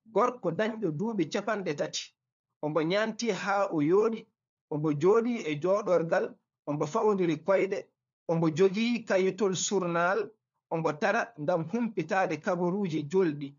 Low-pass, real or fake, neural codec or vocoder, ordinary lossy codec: 7.2 kHz; fake; codec, 16 kHz, 4 kbps, FunCodec, trained on LibriTTS, 50 frames a second; MP3, 64 kbps